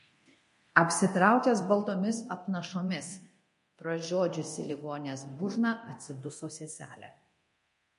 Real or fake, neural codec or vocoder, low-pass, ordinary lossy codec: fake; codec, 24 kHz, 0.9 kbps, DualCodec; 10.8 kHz; MP3, 48 kbps